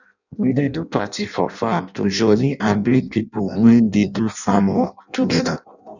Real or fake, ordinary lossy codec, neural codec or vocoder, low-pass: fake; none; codec, 16 kHz in and 24 kHz out, 0.6 kbps, FireRedTTS-2 codec; 7.2 kHz